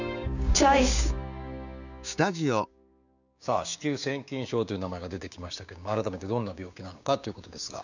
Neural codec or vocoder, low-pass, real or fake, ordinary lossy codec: codec, 16 kHz, 6 kbps, DAC; 7.2 kHz; fake; none